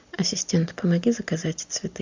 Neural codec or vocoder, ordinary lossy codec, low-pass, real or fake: none; MP3, 64 kbps; 7.2 kHz; real